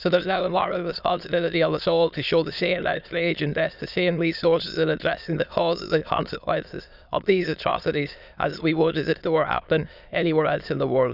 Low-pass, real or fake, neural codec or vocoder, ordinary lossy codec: 5.4 kHz; fake; autoencoder, 22.05 kHz, a latent of 192 numbers a frame, VITS, trained on many speakers; none